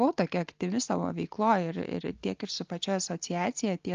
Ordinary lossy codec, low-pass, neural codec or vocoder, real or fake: Opus, 24 kbps; 7.2 kHz; none; real